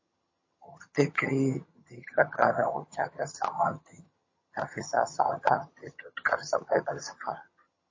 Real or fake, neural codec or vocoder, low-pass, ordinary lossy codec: fake; vocoder, 22.05 kHz, 80 mel bands, HiFi-GAN; 7.2 kHz; MP3, 32 kbps